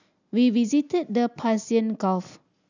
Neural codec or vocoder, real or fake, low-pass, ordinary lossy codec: none; real; 7.2 kHz; none